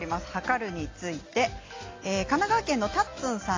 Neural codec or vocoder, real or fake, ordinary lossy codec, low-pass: none; real; AAC, 32 kbps; 7.2 kHz